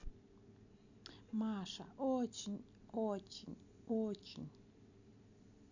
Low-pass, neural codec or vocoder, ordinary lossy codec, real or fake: 7.2 kHz; none; none; real